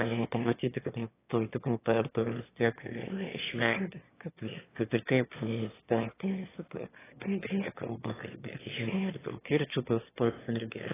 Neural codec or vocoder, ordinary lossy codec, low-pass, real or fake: autoencoder, 22.05 kHz, a latent of 192 numbers a frame, VITS, trained on one speaker; AAC, 16 kbps; 3.6 kHz; fake